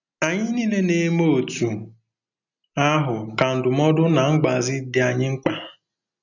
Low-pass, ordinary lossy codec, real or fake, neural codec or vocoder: 7.2 kHz; none; real; none